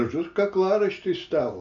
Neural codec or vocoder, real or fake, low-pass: none; real; 7.2 kHz